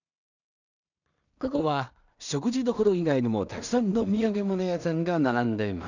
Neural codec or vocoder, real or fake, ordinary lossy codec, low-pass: codec, 16 kHz in and 24 kHz out, 0.4 kbps, LongCat-Audio-Codec, two codebook decoder; fake; none; 7.2 kHz